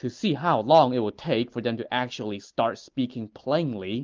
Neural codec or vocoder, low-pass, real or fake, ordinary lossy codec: none; 7.2 kHz; real; Opus, 32 kbps